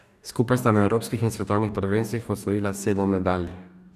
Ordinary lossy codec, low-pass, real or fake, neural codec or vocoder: none; 14.4 kHz; fake; codec, 44.1 kHz, 2.6 kbps, DAC